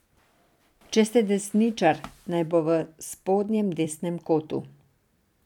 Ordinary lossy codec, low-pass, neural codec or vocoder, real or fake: none; 19.8 kHz; vocoder, 44.1 kHz, 128 mel bands every 256 samples, BigVGAN v2; fake